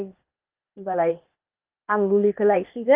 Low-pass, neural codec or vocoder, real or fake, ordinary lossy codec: 3.6 kHz; codec, 16 kHz, 0.8 kbps, ZipCodec; fake; Opus, 32 kbps